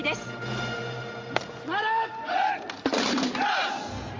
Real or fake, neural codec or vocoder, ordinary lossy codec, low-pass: real; none; Opus, 32 kbps; 7.2 kHz